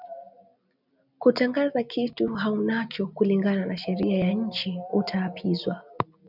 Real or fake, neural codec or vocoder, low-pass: real; none; 5.4 kHz